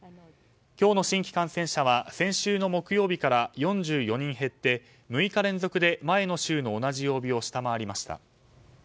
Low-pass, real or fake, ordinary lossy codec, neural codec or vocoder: none; real; none; none